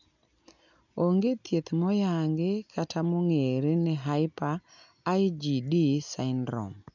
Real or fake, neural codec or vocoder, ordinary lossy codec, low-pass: real; none; none; 7.2 kHz